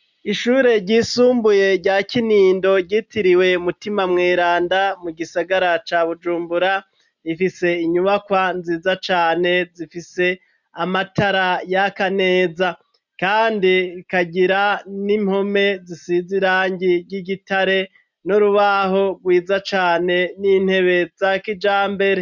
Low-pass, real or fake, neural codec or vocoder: 7.2 kHz; real; none